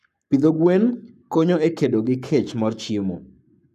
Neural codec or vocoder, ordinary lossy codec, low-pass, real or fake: codec, 44.1 kHz, 7.8 kbps, Pupu-Codec; none; 14.4 kHz; fake